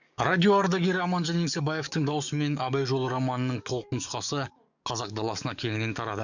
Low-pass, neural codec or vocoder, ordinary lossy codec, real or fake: 7.2 kHz; codec, 44.1 kHz, 7.8 kbps, DAC; none; fake